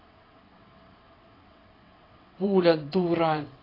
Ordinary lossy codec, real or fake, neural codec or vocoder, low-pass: AAC, 24 kbps; fake; vocoder, 22.05 kHz, 80 mel bands, WaveNeXt; 5.4 kHz